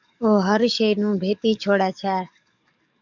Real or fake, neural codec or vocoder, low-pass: fake; codec, 44.1 kHz, 7.8 kbps, DAC; 7.2 kHz